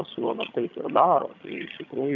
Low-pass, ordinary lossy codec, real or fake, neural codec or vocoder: 7.2 kHz; MP3, 64 kbps; fake; vocoder, 22.05 kHz, 80 mel bands, HiFi-GAN